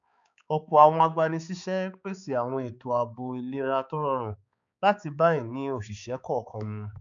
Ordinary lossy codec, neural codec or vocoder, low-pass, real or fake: none; codec, 16 kHz, 4 kbps, X-Codec, HuBERT features, trained on balanced general audio; 7.2 kHz; fake